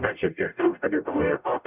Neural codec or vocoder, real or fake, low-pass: codec, 44.1 kHz, 0.9 kbps, DAC; fake; 3.6 kHz